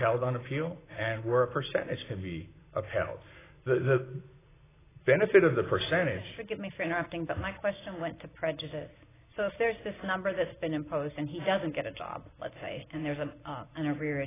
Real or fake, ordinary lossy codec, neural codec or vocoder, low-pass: real; AAC, 16 kbps; none; 3.6 kHz